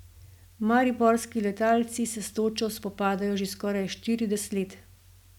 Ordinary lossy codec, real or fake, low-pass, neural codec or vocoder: none; real; 19.8 kHz; none